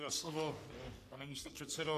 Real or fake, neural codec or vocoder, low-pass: fake; codec, 44.1 kHz, 3.4 kbps, Pupu-Codec; 14.4 kHz